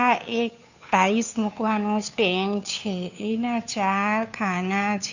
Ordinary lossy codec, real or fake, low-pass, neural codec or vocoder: none; fake; 7.2 kHz; codec, 16 kHz, 2 kbps, FunCodec, trained on Chinese and English, 25 frames a second